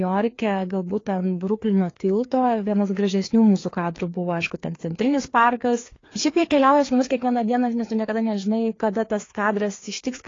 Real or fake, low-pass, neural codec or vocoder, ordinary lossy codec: fake; 7.2 kHz; codec, 16 kHz, 2 kbps, FreqCodec, larger model; AAC, 32 kbps